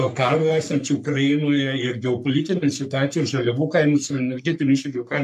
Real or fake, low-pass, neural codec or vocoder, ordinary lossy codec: fake; 14.4 kHz; codec, 44.1 kHz, 3.4 kbps, Pupu-Codec; AAC, 96 kbps